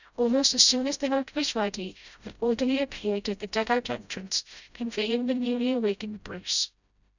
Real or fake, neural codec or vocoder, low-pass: fake; codec, 16 kHz, 0.5 kbps, FreqCodec, smaller model; 7.2 kHz